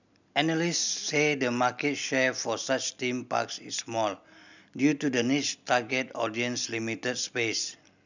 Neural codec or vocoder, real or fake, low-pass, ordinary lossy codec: none; real; 7.2 kHz; none